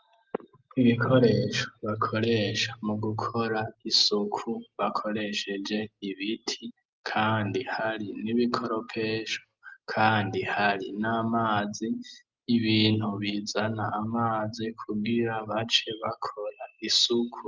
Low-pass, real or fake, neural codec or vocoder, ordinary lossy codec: 7.2 kHz; real; none; Opus, 32 kbps